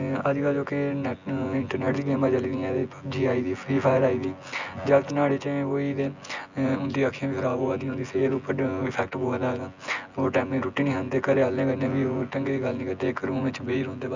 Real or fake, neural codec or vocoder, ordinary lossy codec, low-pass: fake; vocoder, 24 kHz, 100 mel bands, Vocos; Opus, 64 kbps; 7.2 kHz